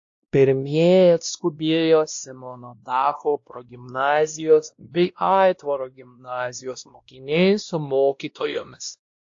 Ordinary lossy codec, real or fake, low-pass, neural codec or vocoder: AAC, 48 kbps; fake; 7.2 kHz; codec, 16 kHz, 1 kbps, X-Codec, WavLM features, trained on Multilingual LibriSpeech